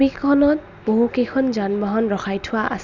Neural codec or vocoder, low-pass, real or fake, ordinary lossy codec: none; 7.2 kHz; real; none